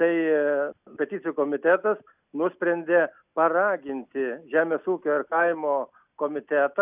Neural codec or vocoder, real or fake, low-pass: none; real; 3.6 kHz